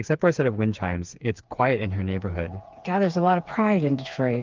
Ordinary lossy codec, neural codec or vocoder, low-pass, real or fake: Opus, 32 kbps; codec, 16 kHz, 4 kbps, FreqCodec, smaller model; 7.2 kHz; fake